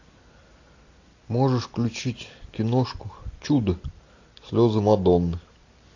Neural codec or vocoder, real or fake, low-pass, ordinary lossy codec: none; real; 7.2 kHz; AAC, 48 kbps